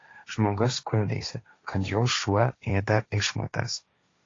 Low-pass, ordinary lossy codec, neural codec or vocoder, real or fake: 7.2 kHz; AAC, 32 kbps; codec, 16 kHz, 1.1 kbps, Voila-Tokenizer; fake